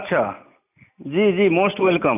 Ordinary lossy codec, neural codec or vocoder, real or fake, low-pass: none; none; real; 3.6 kHz